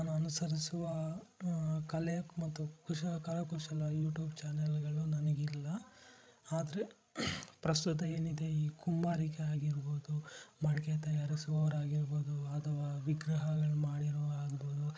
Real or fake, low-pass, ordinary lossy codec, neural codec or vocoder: fake; none; none; codec, 16 kHz, 16 kbps, FreqCodec, larger model